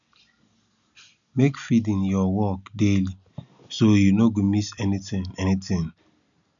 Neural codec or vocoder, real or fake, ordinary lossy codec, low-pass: none; real; none; 7.2 kHz